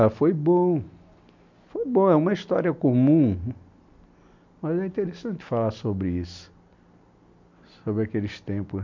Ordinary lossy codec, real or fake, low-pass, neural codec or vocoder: MP3, 64 kbps; real; 7.2 kHz; none